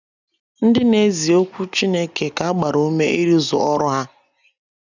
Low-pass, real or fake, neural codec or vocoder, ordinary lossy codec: 7.2 kHz; real; none; none